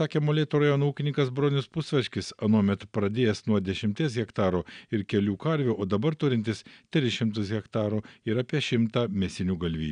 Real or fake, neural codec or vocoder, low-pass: real; none; 9.9 kHz